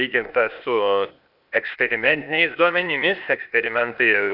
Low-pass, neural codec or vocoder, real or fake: 5.4 kHz; codec, 16 kHz, 0.8 kbps, ZipCodec; fake